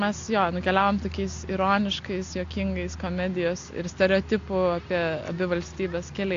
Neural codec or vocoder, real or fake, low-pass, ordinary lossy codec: none; real; 7.2 kHz; AAC, 48 kbps